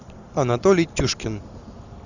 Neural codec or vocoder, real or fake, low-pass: none; real; 7.2 kHz